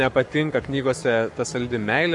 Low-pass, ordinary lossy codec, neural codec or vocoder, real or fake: 10.8 kHz; MP3, 64 kbps; codec, 44.1 kHz, 7.8 kbps, Pupu-Codec; fake